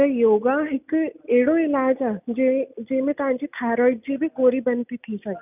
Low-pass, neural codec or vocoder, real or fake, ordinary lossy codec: 3.6 kHz; none; real; none